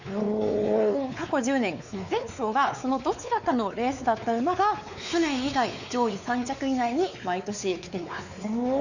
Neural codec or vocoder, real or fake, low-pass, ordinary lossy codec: codec, 16 kHz, 4 kbps, X-Codec, WavLM features, trained on Multilingual LibriSpeech; fake; 7.2 kHz; none